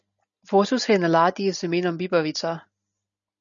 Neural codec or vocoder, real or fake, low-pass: none; real; 7.2 kHz